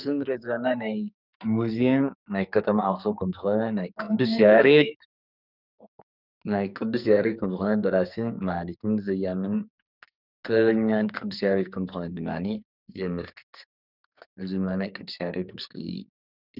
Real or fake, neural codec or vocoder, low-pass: fake; codec, 44.1 kHz, 2.6 kbps, SNAC; 5.4 kHz